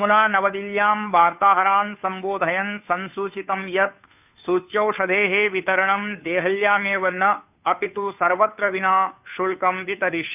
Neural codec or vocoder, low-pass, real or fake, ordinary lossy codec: codec, 16 kHz, 2 kbps, FunCodec, trained on Chinese and English, 25 frames a second; 3.6 kHz; fake; none